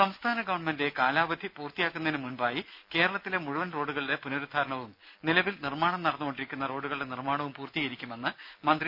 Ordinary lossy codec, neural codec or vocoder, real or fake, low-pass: none; none; real; 5.4 kHz